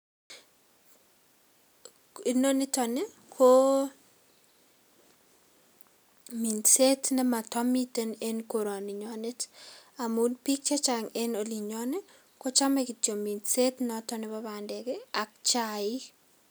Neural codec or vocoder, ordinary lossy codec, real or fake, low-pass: none; none; real; none